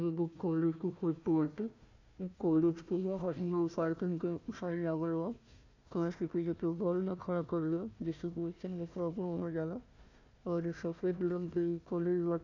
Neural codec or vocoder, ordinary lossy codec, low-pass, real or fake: codec, 16 kHz, 1 kbps, FunCodec, trained on Chinese and English, 50 frames a second; none; 7.2 kHz; fake